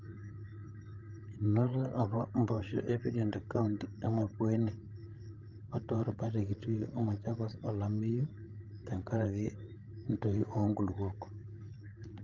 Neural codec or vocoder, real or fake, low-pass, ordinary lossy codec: codec, 16 kHz, 16 kbps, FreqCodec, larger model; fake; 7.2 kHz; Opus, 32 kbps